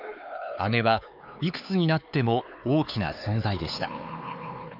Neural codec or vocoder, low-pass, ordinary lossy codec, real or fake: codec, 16 kHz, 4 kbps, X-Codec, HuBERT features, trained on LibriSpeech; 5.4 kHz; none; fake